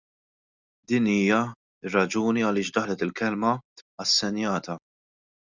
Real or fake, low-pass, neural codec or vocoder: real; 7.2 kHz; none